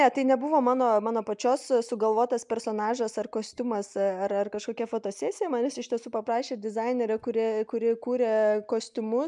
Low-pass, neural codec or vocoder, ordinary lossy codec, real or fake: 10.8 kHz; none; MP3, 96 kbps; real